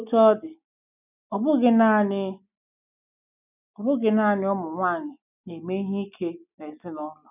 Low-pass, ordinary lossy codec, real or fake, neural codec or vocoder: 3.6 kHz; none; real; none